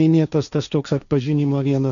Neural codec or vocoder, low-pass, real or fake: codec, 16 kHz, 1.1 kbps, Voila-Tokenizer; 7.2 kHz; fake